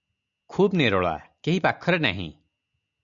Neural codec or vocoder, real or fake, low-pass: none; real; 7.2 kHz